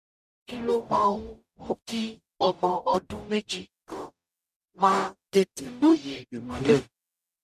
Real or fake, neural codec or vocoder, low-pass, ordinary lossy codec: fake; codec, 44.1 kHz, 0.9 kbps, DAC; 14.4 kHz; none